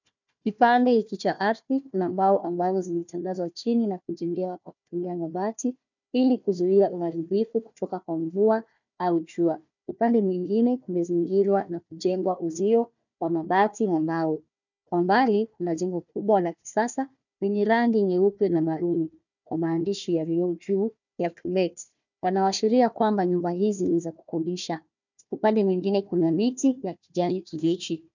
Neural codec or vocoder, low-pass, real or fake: codec, 16 kHz, 1 kbps, FunCodec, trained on Chinese and English, 50 frames a second; 7.2 kHz; fake